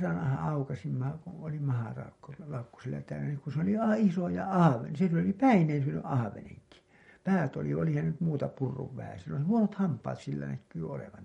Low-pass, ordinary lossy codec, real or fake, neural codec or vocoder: 19.8 kHz; MP3, 48 kbps; real; none